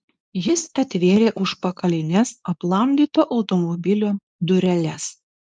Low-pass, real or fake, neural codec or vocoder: 9.9 kHz; fake; codec, 24 kHz, 0.9 kbps, WavTokenizer, medium speech release version 2